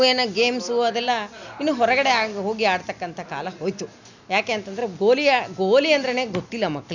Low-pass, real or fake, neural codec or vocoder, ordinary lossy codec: 7.2 kHz; real; none; none